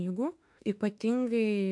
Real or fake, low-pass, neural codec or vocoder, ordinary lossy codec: fake; 10.8 kHz; autoencoder, 48 kHz, 32 numbers a frame, DAC-VAE, trained on Japanese speech; AAC, 64 kbps